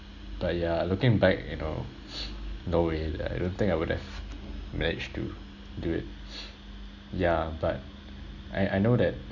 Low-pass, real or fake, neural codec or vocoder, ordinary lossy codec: 7.2 kHz; real; none; none